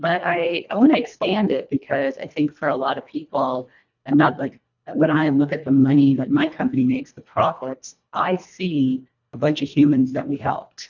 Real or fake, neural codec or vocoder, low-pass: fake; codec, 24 kHz, 1.5 kbps, HILCodec; 7.2 kHz